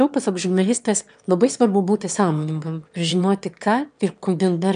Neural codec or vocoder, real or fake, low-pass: autoencoder, 22.05 kHz, a latent of 192 numbers a frame, VITS, trained on one speaker; fake; 9.9 kHz